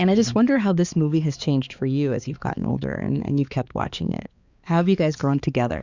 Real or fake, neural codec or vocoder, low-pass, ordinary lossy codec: fake; codec, 16 kHz, 4 kbps, X-Codec, HuBERT features, trained on balanced general audio; 7.2 kHz; Opus, 64 kbps